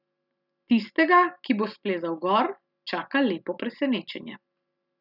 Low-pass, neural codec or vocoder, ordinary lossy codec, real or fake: 5.4 kHz; none; none; real